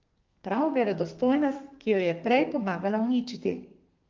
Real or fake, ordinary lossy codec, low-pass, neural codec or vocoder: fake; Opus, 24 kbps; 7.2 kHz; codec, 32 kHz, 1.9 kbps, SNAC